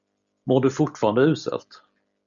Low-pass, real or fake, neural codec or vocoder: 7.2 kHz; real; none